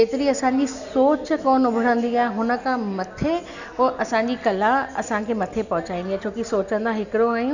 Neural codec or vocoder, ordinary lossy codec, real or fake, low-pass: none; none; real; 7.2 kHz